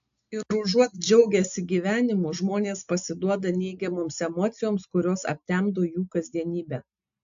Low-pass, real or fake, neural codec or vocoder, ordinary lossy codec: 7.2 kHz; real; none; AAC, 64 kbps